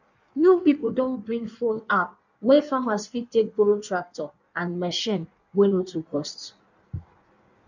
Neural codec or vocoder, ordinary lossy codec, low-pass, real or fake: codec, 16 kHz in and 24 kHz out, 1.1 kbps, FireRedTTS-2 codec; none; 7.2 kHz; fake